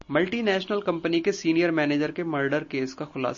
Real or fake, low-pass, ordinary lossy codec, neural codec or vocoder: real; 7.2 kHz; MP3, 32 kbps; none